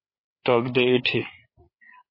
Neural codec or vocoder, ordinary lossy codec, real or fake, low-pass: codec, 16 kHz, 8 kbps, FreqCodec, larger model; MP3, 24 kbps; fake; 5.4 kHz